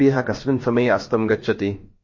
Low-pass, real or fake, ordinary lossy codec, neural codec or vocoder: 7.2 kHz; fake; MP3, 32 kbps; codec, 16 kHz, about 1 kbps, DyCAST, with the encoder's durations